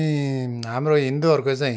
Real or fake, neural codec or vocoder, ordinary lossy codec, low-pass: real; none; none; none